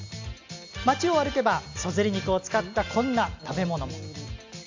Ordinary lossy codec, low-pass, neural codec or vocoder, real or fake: AAC, 48 kbps; 7.2 kHz; none; real